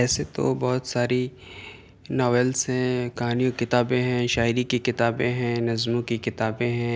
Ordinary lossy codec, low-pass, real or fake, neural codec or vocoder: none; none; real; none